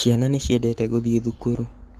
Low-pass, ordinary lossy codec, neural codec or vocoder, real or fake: 14.4 kHz; Opus, 24 kbps; codec, 44.1 kHz, 7.8 kbps, Pupu-Codec; fake